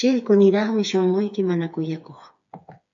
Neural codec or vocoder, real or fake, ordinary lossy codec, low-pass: codec, 16 kHz, 4 kbps, FreqCodec, smaller model; fake; AAC, 64 kbps; 7.2 kHz